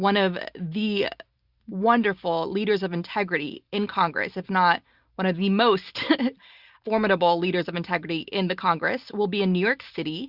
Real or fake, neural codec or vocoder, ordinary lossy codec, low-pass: real; none; Opus, 64 kbps; 5.4 kHz